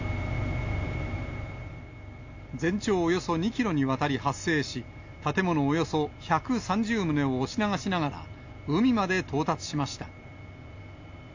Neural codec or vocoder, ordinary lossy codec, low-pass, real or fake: none; AAC, 48 kbps; 7.2 kHz; real